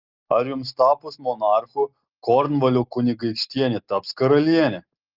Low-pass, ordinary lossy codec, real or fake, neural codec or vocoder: 5.4 kHz; Opus, 32 kbps; real; none